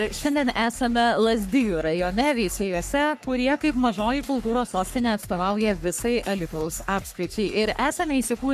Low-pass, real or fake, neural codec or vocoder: 14.4 kHz; fake; codec, 44.1 kHz, 3.4 kbps, Pupu-Codec